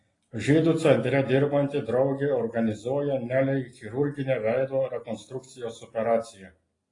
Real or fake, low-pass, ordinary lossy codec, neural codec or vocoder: real; 10.8 kHz; AAC, 32 kbps; none